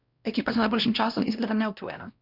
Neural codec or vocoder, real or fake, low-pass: codec, 16 kHz, 1 kbps, X-Codec, WavLM features, trained on Multilingual LibriSpeech; fake; 5.4 kHz